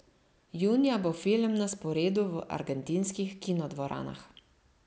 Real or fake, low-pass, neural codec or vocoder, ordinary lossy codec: real; none; none; none